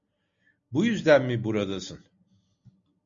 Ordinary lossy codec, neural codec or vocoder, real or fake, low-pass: MP3, 64 kbps; none; real; 7.2 kHz